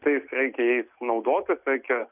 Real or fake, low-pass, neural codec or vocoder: real; 3.6 kHz; none